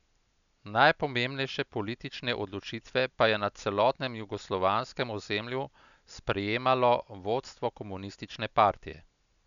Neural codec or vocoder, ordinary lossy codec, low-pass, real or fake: none; none; 7.2 kHz; real